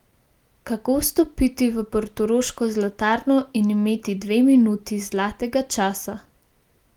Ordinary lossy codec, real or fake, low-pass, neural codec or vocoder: Opus, 24 kbps; real; 19.8 kHz; none